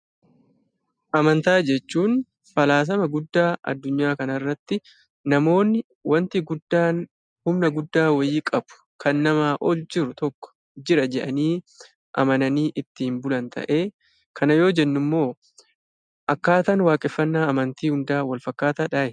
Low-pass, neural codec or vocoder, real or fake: 9.9 kHz; none; real